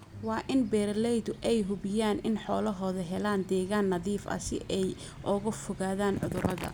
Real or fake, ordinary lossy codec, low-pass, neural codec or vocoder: real; none; none; none